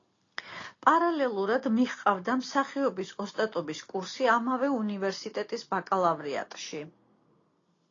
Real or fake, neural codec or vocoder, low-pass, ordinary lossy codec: real; none; 7.2 kHz; AAC, 32 kbps